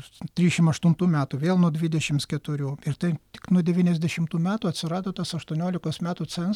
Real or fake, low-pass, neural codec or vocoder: real; 19.8 kHz; none